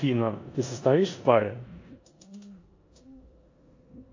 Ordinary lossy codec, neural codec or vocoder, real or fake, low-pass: AAC, 48 kbps; codec, 16 kHz in and 24 kHz out, 0.9 kbps, LongCat-Audio-Codec, four codebook decoder; fake; 7.2 kHz